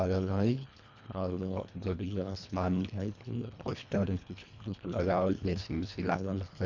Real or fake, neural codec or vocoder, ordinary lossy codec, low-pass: fake; codec, 24 kHz, 1.5 kbps, HILCodec; none; 7.2 kHz